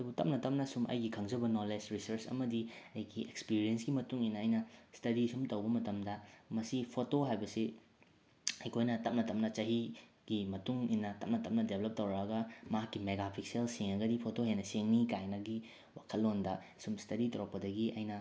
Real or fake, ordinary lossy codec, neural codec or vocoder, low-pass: real; none; none; none